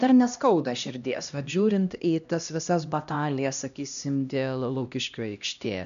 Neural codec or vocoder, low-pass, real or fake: codec, 16 kHz, 1 kbps, X-Codec, HuBERT features, trained on LibriSpeech; 7.2 kHz; fake